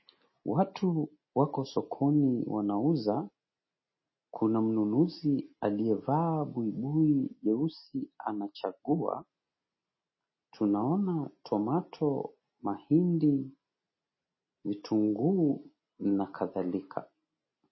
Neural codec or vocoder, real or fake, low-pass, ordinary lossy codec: none; real; 7.2 kHz; MP3, 24 kbps